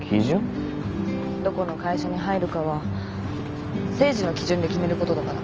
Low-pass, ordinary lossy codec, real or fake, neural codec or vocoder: 7.2 kHz; Opus, 24 kbps; real; none